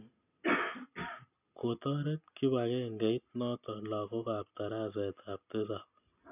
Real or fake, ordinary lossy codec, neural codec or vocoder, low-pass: real; none; none; 3.6 kHz